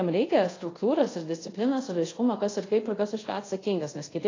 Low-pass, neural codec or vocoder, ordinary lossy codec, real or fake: 7.2 kHz; codec, 24 kHz, 0.5 kbps, DualCodec; AAC, 32 kbps; fake